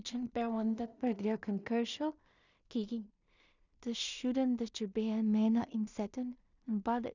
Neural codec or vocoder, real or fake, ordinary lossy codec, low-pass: codec, 16 kHz in and 24 kHz out, 0.4 kbps, LongCat-Audio-Codec, two codebook decoder; fake; none; 7.2 kHz